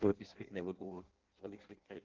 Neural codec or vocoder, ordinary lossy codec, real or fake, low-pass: codec, 16 kHz in and 24 kHz out, 0.6 kbps, FireRedTTS-2 codec; Opus, 16 kbps; fake; 7.2 kHz